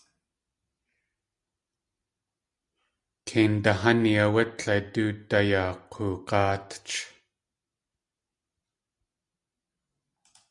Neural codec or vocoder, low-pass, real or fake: none; 10.8 kHz; real